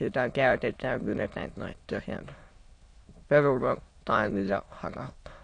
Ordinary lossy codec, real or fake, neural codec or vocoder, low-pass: AAC, 48 kbps; fake; autoencoder, 22.05 kHz, a latent of 192 numbers a frame, VITS, trained on many speakers; 9.9 kHz